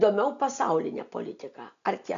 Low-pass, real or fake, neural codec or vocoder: 7.2 kHz; real; none